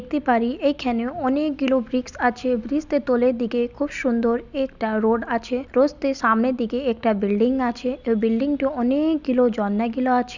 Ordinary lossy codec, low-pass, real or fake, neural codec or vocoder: none; 7.2 kHz; real; none